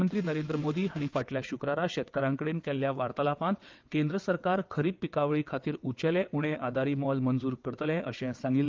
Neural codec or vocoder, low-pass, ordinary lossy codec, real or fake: vocoder, 22.05 kHz, 80 mel bands, WaveNeXt; 7.2 kHz; Opus, 32 kbps; fake